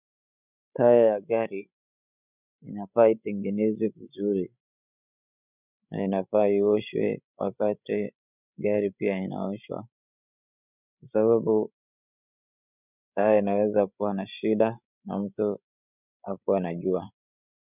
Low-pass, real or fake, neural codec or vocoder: 3.6 kHz; fake; codec, 16 kHz, 16 kbps, FreqCodec, larger model